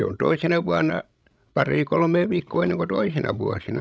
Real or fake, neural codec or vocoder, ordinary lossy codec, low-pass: fake; codec, 16 kHz, 16 kbps, FreqCodec, larger model; none; none